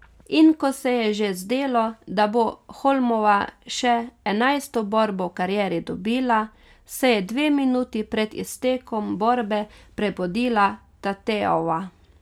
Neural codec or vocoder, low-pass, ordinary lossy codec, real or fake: none; 19.8 kHz; none; real